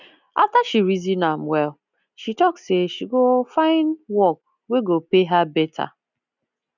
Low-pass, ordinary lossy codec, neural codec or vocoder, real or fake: 7.2 kHz; none; none; real